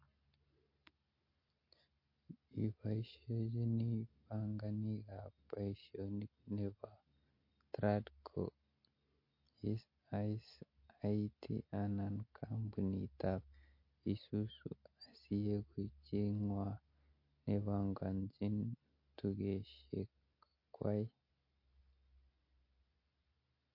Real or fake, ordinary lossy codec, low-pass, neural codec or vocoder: real; MP3, 32 kbps; 5.4 kHz; none